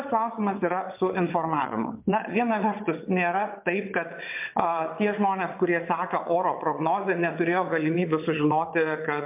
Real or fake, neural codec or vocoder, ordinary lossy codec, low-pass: fake; vocoder, 22.05 kHz, 80 mel bands, WaveNeXt; MP3, 32 kbps; 3.6 kHz